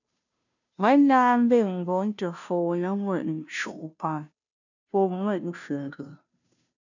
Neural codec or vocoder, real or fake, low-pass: codec, 16 kHz, 0.5 kbps, FunCodec, trained on Chinese and English, 25 frames a second; fake; 7.2 kHz